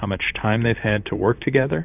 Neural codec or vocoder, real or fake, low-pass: vocoder, 44.1 kHz, 128 mel bands, Pupu-Vocoder; fake; 3.6 kHz